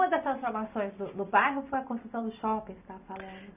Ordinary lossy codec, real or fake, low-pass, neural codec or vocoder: none; real; 3.6 kHz; none